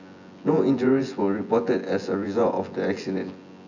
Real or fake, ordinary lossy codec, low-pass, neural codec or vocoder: fake; none; 7.2 kHz; vocoder, 24 kHz, 100 mel bands, Vocos